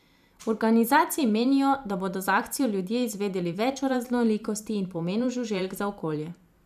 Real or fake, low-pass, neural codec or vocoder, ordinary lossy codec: fake; 14.4 kHz; vocoder, 44.1 kHz, 128 mel bands every 512 samples, BigVGAN v2; none